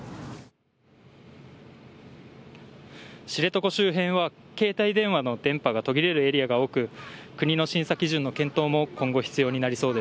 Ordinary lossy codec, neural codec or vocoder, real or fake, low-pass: none; none; real; none